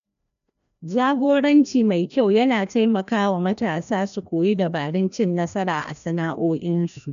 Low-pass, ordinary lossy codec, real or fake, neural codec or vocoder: 7.2 kHz; none; fake; codec, 16 kHz, 1 kbps, FreqCodec, larger model